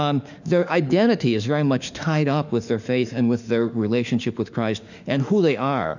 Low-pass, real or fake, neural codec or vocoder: 7.2 kHz; fake; autoencoder, 48 kHz, 32 numbers a frame, DAC-VAE, trained on Japanese speech